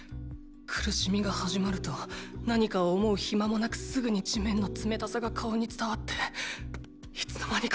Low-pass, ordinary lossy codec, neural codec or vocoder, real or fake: none; none; none; real